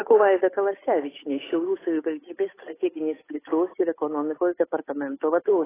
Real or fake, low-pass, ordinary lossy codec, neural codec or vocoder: fake; 3.6 kHz; AAC, 16 kbps; codec, 16 kHz, 8 kbps, FunCodec, trained on Chinese and English, 25 frames a second